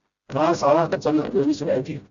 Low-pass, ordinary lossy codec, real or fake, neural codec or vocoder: 7.2 kHz; Opus, 64 kbps; fake; codec, 16 kHz, 0.5 kbps, FreqCodec, smaller model